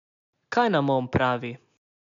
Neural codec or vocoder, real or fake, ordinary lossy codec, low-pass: none; real; none; 7.2 kHz